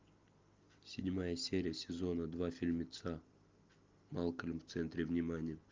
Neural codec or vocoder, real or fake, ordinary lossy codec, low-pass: none; real; Opus, 32 kbps; 7.2 kHz